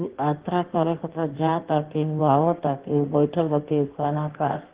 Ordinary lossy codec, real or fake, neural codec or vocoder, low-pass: Opus, 16 kbps; fake; codec, 16 kHz in and 24 kHz out, 1.1 kbps, FireRedTTS-2 codec; 3.6 kHz